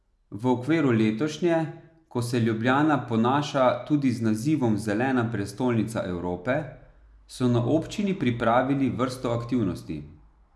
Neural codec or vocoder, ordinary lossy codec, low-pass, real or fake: none; none; none; real